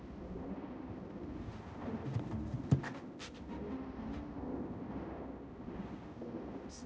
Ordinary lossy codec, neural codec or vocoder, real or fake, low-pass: none; codec, 16 kHz, 0.5 kbps, X-Codec, HuBERT features, trained on balanced general audio; fake; none